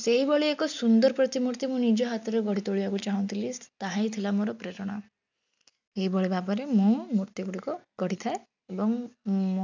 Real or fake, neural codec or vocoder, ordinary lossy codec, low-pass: real; none; none; 7.2 kHz